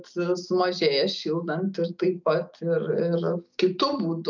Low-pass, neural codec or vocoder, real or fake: 7.2 kHz; none; real